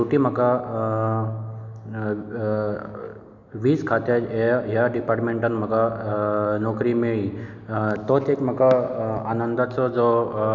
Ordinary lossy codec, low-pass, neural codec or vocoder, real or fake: none; 7.2 kHz; none; real